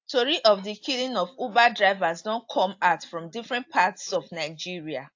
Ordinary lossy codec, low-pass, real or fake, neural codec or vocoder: AAC, 48 kbps; 7.2 kHz; real; none